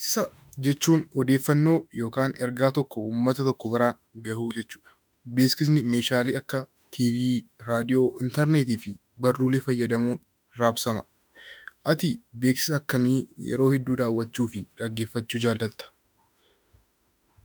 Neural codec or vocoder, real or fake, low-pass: autoencoder, 48 kHz, 32 numbers a frame, DAC-VAE, trained on Japanese speech; fake; 19.8 kHz